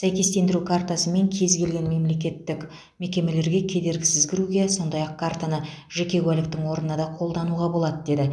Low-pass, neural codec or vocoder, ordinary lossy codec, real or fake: none; none; none; real